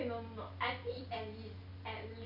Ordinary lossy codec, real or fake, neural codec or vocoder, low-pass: none; real; none; 5.4 kHz